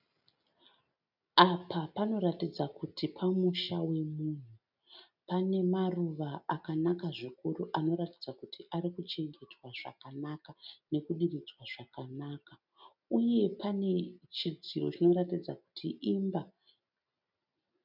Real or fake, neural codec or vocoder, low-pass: real; none; 5.4 kHz